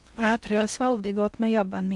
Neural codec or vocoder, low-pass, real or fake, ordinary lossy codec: codec, 16 kHz in and 24 kHz out, 0.6 kbps, FocalCodec, streaming, 4096 codes; 10.8 kHz; fake; none